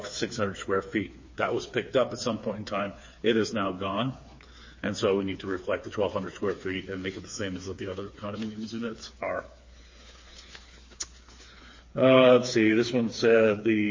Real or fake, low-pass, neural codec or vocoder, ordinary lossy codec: fake; 7.2 kHz; codec, 16 kHz, 4 kbps, FreqCodec, smaller model; MP3, 32 kbps